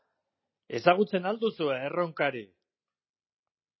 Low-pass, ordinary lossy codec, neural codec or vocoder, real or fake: 7.2 kHz; MP3, 24 kbps; none; real